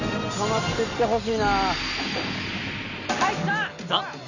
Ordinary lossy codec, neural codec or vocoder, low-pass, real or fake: none; none; 7.2 kHz; real